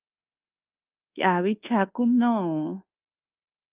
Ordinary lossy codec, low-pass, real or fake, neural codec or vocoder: Opus, 24 kbps; 3.6 kHz; fake; codec, 24 kHz, 1.2 kbps, DualCodec